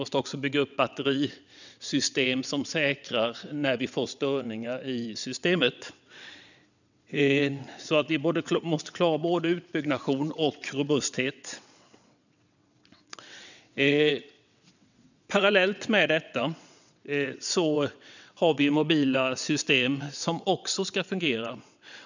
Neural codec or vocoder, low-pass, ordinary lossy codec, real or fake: vocoder, 22.05 kHz, 80 mel bands, WaveNeXt; 7.2 kHz; none; fake